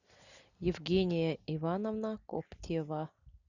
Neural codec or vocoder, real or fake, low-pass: none; real; 7.2 kHz